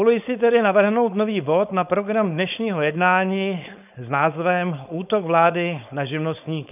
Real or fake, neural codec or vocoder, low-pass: fake; codec, 16 kHz, 4.8 kbps, FACodec; 3.6 kHz